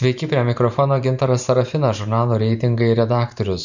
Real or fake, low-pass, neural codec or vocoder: fake; 7.2 kHz; vocoder, 44.1 kHz, 128 mel bands every 512 samples, BigVGAN v2